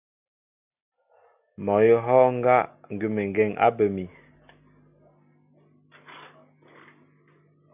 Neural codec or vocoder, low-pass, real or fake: none; 3.6 kHz; real